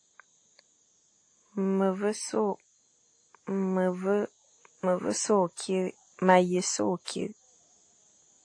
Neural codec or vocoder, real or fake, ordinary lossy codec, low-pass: none; real; AAC, 48 kbps; 9.9 kHz